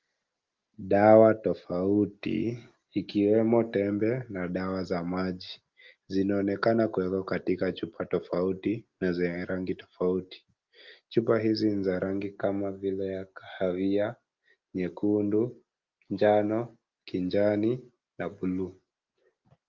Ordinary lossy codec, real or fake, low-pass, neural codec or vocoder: Opus, 32 kbps; real; 7.2 kHz; none